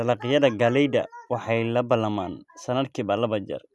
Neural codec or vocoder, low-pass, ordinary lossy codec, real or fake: none; none; none; real